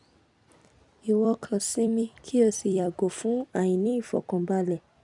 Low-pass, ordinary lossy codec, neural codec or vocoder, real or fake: 10.8 kHz; none; vocoder, 44.1 kHz, 128 mel bands every 256 samples, BigVGAN v2; fake